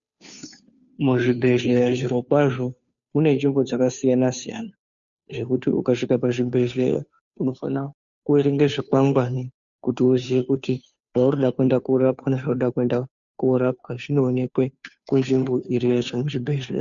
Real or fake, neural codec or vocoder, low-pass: fake; codec, 16 kHz, 2 kbps, FunCodec, trained on Chinese and English, 25 frames a second; 7.2 kHz